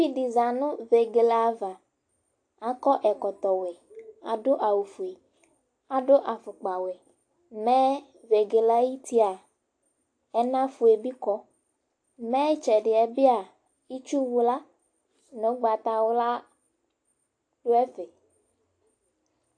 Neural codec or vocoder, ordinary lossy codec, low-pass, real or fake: none; AAC, 48 kbps; 9.9 kHz; real